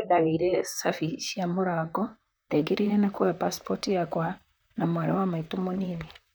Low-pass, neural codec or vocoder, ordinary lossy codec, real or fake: none; vocoder, 44.1 kHz, 128 mel bands, Pupu-Vocoder; none; fake